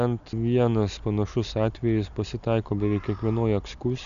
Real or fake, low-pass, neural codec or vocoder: real; 7.2 kHz; none